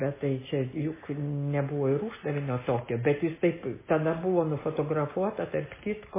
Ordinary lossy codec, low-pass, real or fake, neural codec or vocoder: MP3, 16 kbps; 3.6 kHz; real; none